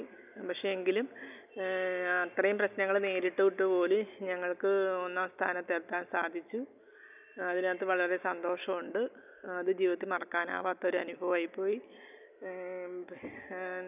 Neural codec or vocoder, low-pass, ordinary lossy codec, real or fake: none; 3.6 kHz; none; real